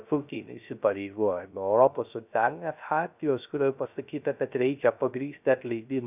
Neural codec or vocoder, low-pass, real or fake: codec, 16 kHz, 0.3 kbps, FocalCodec; 3.6 kHz; fake